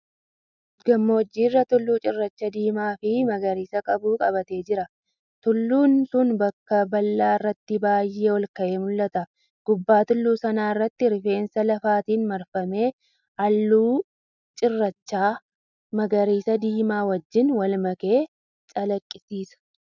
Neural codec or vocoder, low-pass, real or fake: none; 7.2 kHz; real